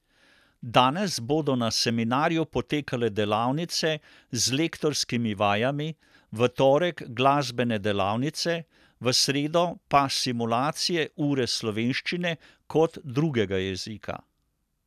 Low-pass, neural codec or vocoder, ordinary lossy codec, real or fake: 14.4 kHz; none; none; real